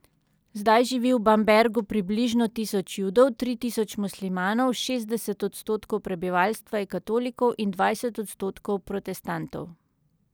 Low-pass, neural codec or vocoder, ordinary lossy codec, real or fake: none; none; none; real